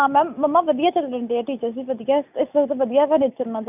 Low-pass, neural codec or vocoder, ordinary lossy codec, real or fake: 3.6 kHz; none; none; real